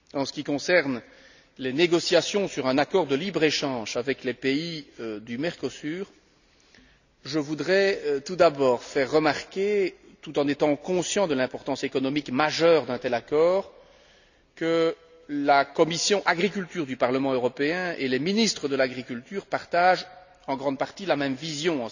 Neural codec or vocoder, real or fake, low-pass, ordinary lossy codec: none; real; 7.2 kHz; none